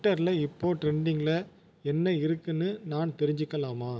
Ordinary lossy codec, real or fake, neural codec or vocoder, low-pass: none; real; none; none